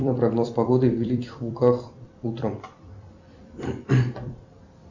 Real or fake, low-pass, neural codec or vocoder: fake; 7.2 kHz; vocoder, 44.1 kHz, 128 mel bands every 256 samples, BigVGAN v2